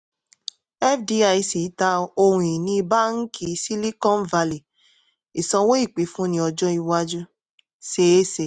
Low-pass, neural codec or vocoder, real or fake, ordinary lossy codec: none; none; real; none